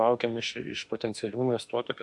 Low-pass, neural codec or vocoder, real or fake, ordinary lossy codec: 10.8 kHz; autoencoder, 48 kHz, 32 numbers a frame, DAC-VAE, trained on Japanese speech; fake; AAC, 64 kbps